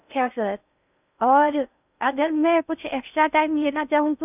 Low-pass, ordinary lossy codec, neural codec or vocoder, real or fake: 3.6 kHz; none; codec, 16 kHz in and 24 kHz out, 0.6 kbps, FocalCodec, streaming, 4096 codes; fake